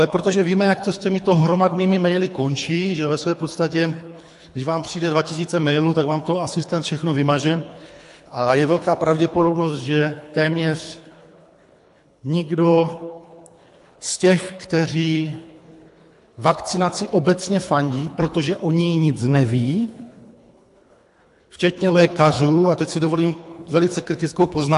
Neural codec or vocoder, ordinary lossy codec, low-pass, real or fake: codec, 24 kHz, 3 kbps, HILCodec; AAC, 64 kbps; 10.8 kHz; fake